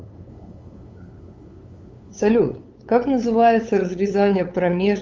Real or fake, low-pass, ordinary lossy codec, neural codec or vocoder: fake; 7.2 kHz; Opus, 32 kbps; codec, 16 kHz, 8 kbps, FunCodec, trained on LibriTTS, 25 frames a second